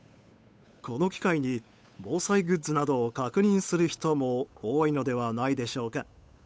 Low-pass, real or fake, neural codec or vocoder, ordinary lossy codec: none; fake; codec, 16 kHz, 8 kbps, FunCodec, trained on Chinese and English, 25 frames a second; none